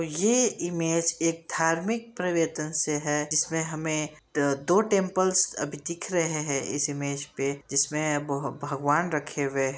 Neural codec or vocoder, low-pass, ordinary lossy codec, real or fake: none; none; none; real